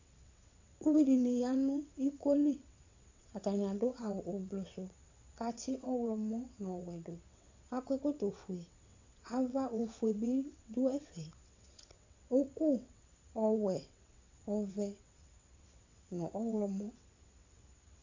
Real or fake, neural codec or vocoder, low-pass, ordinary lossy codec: fake; vocoder, 22.05 kHz, 80 mel bands, WaveNeXt; 7.2 kHz; AAC, 48 kbps